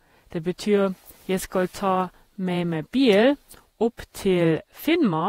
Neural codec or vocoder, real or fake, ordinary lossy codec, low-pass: vocoder, 48 kHz, 128 mel bands, Vocos; fake; AAC, 48 kbps; 19.8 kHz